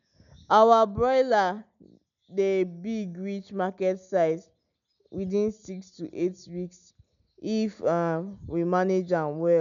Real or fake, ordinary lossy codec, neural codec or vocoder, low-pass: real; none; none; 7.2 kHz